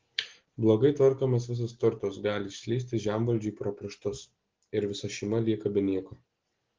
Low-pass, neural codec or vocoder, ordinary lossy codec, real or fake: 7.2 kHz; none; Opus, 16 kbps; real